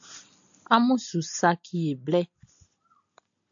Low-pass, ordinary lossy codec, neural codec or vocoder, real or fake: 7.2 kHz; AAC, 64 kbps; none; real